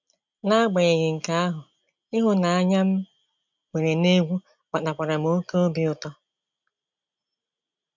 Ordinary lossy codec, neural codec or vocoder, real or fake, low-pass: MP3, 64 kbps; none; real; 7.2 kHz